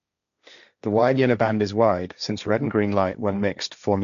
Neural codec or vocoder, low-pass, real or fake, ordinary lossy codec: codec, 16 kHz, 1.1 kbps, Voila-Tokenizer; 7.2 kHz; fake; none